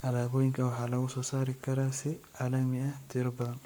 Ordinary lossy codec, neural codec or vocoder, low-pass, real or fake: none; codec, 44.1 kHz, 7.8 kbps, Pupu-Codec; none; fake